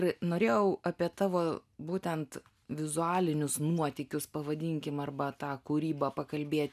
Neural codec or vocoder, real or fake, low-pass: none; real; 14.4 kHz